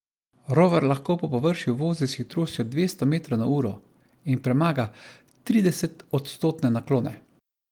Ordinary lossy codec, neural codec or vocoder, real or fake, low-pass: Opus, 24 kbps; none; real; 19.8 kHz